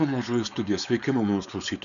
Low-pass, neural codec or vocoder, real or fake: 7.2 kHz; codec, 16 kHz, 4.8 kbps, FACodec; fake